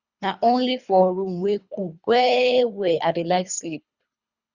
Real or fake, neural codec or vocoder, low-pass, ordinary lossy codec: fake; codec, 24 kHz, 3 kbps, HILCodec; 7.2 kHz; Opus, 64 kbps